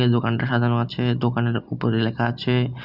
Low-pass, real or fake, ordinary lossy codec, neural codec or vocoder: 5.4 kHz; real; Opus, 64 kbps; none